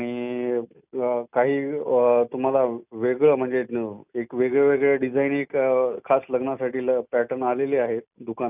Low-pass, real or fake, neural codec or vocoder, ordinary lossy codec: 3.6 kHz; real; none; none